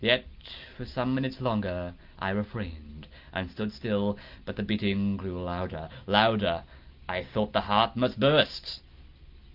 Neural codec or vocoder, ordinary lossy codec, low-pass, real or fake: none; Opus, 24 kbps; 5.4 kHz; real